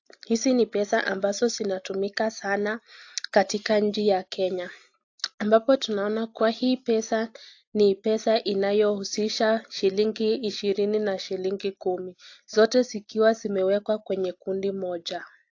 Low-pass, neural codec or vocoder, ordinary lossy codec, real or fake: 7.2 kHz; none; AAC, 48 kbps; real